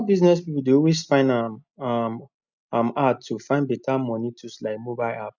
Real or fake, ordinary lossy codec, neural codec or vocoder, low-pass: real; none; none; 7.2 kHz